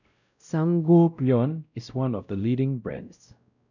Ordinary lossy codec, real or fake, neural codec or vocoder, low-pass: none; fake; codec, 16 kHz, 0.5 kbps, X-Codec, WavLM features, trained on Multilingual LibriSpeech; 7.2 kHz